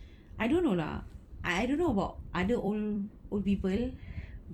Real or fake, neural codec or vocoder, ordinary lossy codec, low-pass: fake; vocoder, 44.1 kHz, 128 mel bands every 256 samples, BigVGAN v2; MP3, 96 kbps; 19.8 kHz